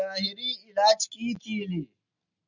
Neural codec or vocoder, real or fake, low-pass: none; real; 7.2 kHz